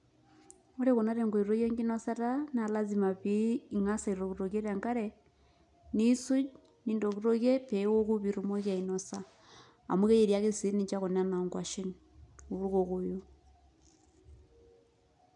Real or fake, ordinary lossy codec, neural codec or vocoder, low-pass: real; none; none; 10.8 kHz